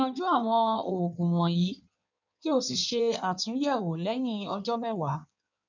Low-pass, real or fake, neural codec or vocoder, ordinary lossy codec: 7.2 kHz; fake; codec, 16 kHz in and 24 kHz out, 1.1 kbps, FireRedTTS-2 codec; none